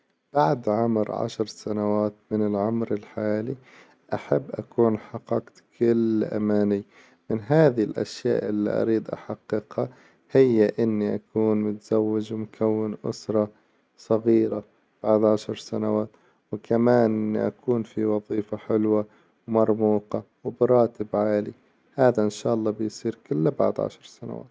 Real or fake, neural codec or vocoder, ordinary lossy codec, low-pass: real; none; none; none